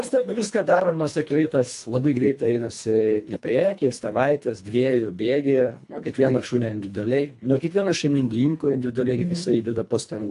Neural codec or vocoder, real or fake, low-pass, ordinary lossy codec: codec, 24 kHz, 1.5 kbps, HILCodec; fake; 10.8 kHz; AAC, 64 kbps